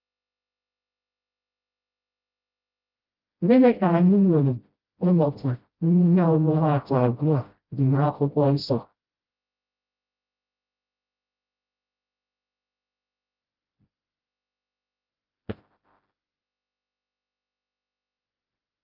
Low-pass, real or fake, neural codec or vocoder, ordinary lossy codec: 5.4 kHz; fake; codec, 16 kHz, 0.5 kbps, FreqCodec, smaller model; Opus, 32 kbps